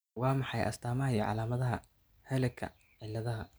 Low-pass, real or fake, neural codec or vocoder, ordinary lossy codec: none; real; none; none